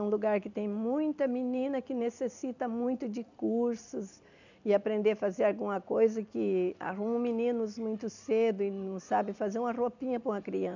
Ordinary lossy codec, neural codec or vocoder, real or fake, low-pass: none; none; real; 7.2 kHz